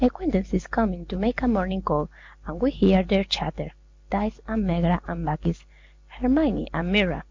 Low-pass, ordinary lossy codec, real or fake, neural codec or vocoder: 7.2 kHz; MP3, 48 kbps; real; none